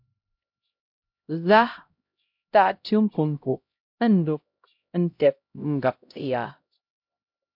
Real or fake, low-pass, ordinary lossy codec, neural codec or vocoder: fake; 5.4 kHz; MP3, 48 kbps; codec, 16 kHz, 0.5 kbps, X-Codec, HuBERT features, trained on LibriSpeech